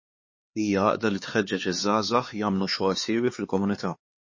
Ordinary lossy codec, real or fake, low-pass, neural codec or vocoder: MP3, 32 kbps; fake; 7.2 kHz; codec, 16 kHz, 4 kbps, X-Codec, HuBERT features, trained on LibriSpeech